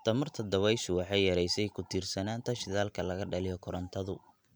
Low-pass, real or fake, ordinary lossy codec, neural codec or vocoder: none; real; none; none